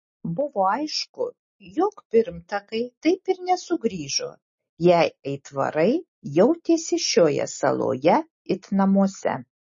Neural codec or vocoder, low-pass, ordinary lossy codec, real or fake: none; 7.2 kHz; MP3, 32 kbps; real